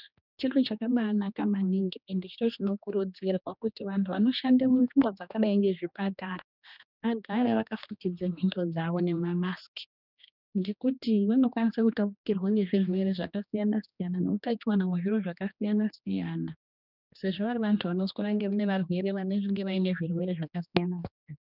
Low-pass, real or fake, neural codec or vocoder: 5.4 kHz; fake; codec, 16 kHz, 2 kbps, X-Codec, HuBERT features, trained on general audio